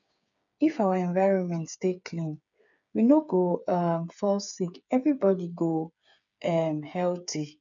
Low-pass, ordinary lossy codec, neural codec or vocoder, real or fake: 7.2 kHz; none; codec, 16 kHz, 8 kbps, FreqCodec, smaller model; fake